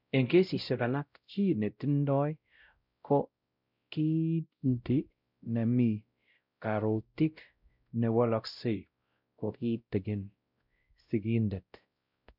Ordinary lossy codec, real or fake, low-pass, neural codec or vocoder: none; fake; 5.4 kHz; codec, 16 kHz, 0.5 kbps, X-Codec, WavLM features, trained on Multilingual LibriSpeech